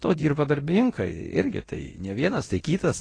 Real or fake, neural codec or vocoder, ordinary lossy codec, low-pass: fake; codec, 24 kHz, 0.9 kbps, DualCodec; AAC, 32 kbps; 9.9 kHz